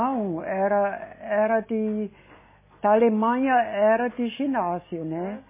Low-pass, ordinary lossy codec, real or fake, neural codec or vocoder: 3.6 kHz; MP3, 16 kbps; real; none